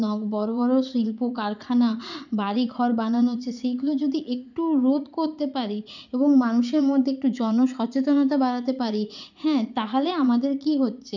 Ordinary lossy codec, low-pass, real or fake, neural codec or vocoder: none; 7.2 kHz; fake; vocoder, 44.1 kHz, 80 mel bands, Vocos